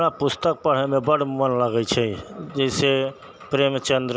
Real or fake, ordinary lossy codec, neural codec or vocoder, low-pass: real; none; none; none